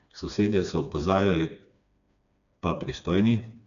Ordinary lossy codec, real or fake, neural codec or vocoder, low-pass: none; fake; codec, 16 kHz, 2 kbps, FreqCodec, smaller model; 7.2 kHz